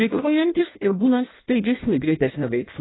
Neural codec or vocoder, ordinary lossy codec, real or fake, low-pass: codec, 16 kHz in and 24 kHz out, 0.6 kbps, FireRedTTS-2 codec; AAC, 16 kbps; fake; 7.2 kHz